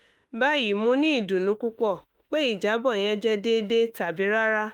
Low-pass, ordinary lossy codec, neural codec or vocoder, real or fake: 19.8 kHz; Opus, 32 kbps; autoencoder, 48 kHz, 32 numbers a frame, DAC-VAE, trained on Japanese speech; fake